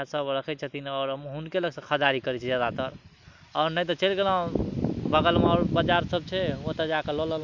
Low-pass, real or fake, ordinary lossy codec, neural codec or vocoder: 7.2 kHz; real; none; none